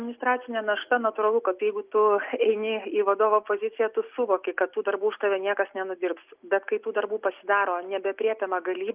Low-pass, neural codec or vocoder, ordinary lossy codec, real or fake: 3.6 kHz; none; Opus, 24 kbps; real